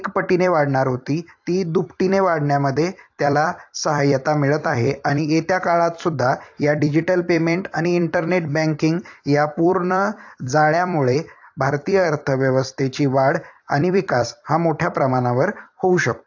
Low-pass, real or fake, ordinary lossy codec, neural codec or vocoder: 7.2 kHz; fake; AAC, 48 kbps; vocoder, 44.1 kHz, 128 mel bands every 256 samples, BigVGAN v2